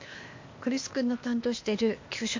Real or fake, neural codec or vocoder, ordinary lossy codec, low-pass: fake; codec, 16 kHz, 0.8 kbps, ZipCodec; MP3, 48 kbps; 7.2 kHz